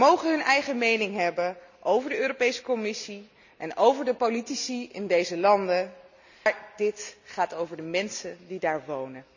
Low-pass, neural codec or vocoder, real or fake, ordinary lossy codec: 7.2 kHz; none; real; none